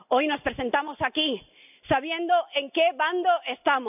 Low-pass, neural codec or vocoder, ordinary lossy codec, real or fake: 3.6 kHz; none; none; real